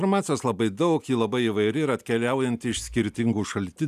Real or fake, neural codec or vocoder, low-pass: real; none; 14.4 kHz